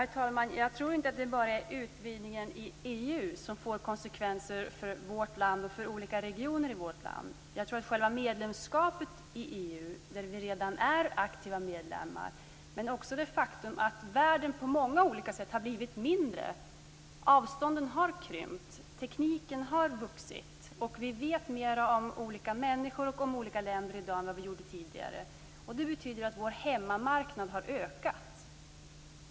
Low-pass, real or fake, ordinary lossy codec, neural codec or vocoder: none; real; none; none